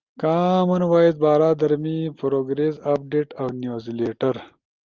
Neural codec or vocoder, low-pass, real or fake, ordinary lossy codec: none; 7.2 kHz; real; Opus, 32 kbps